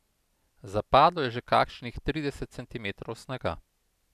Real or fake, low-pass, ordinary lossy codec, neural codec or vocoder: real; 14.4 kHz; none; none